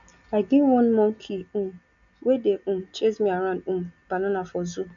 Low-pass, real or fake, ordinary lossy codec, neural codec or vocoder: 7.2 kHz; real; none; none